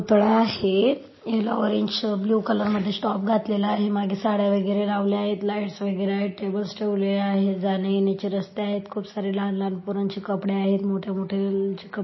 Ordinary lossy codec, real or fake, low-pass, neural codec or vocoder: MP3, 24 kbps; real; 7.2 kHz; none